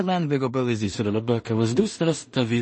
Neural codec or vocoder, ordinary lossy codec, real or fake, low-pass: codec, 16 kHz in and 24 kHz out, 0.4 kbps, LongCat-Audio-Codec, two codebook decoder; MP3, 32 kbps; fake; 10.8 kHz